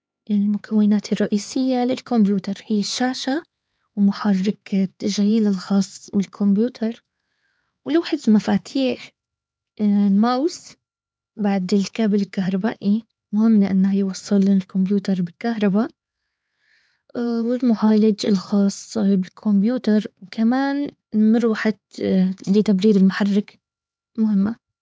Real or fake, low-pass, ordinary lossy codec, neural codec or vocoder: fake; none; none; codec, 16 kHz, 4 kbps, X-Codec, HuBERT features, trained on LibriSpeech